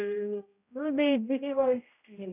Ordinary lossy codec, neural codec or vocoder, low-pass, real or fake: none; codec, 16 kHz, 0.5 kbps, X-Codec, HuBERT features, trained on general audio; 3.6 kHz; fake